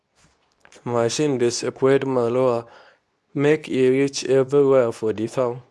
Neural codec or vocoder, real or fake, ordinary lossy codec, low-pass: codec, 24 kHz, 0.9 kbps, WavTokenizer, medium speech release version 2; fake; none; none